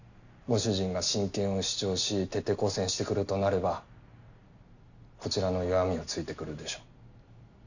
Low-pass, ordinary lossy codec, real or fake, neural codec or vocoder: 7.2 kHz; none; real; none